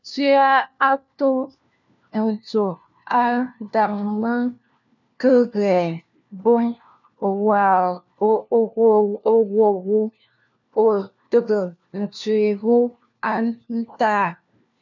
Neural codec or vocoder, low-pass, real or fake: codec, 16 kHz, 1 kbps, FunCodec, trained on LibriTTS, 50 frames a second; 7.2 kHz; fake